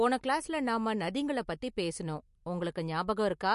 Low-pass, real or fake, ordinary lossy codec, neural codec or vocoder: 14.4 kHz; real; MP3, 48 kbps; none